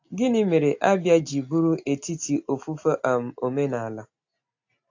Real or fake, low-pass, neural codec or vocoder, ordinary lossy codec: real; 7.2 kHz; none; AAC, 48 kbps